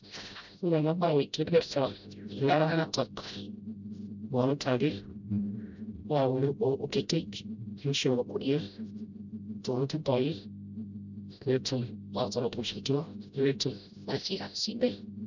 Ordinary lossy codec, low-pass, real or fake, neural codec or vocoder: none; 7.2 kHz; fake; codec, 16 kHz, 0.5 kbps, FreqCodec, smaller model